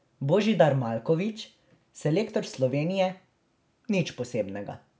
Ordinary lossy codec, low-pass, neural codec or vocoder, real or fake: none; none; none; real